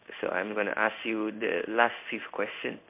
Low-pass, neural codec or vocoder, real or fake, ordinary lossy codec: 3.6 kHz; codec, 16 kHz in and 24 kHz out, 1 kbps, XY-Tokenizer; fake; MP3, 32 kbps